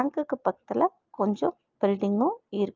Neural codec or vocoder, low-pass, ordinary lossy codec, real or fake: none; 7.2 kHz; Opus, 24 kbps; real